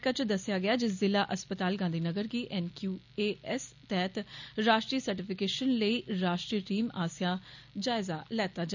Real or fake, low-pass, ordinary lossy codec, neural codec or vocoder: real; 7.2 kHz; none; none